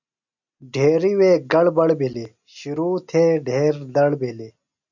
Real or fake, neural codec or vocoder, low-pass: real; none; 7.2 kHz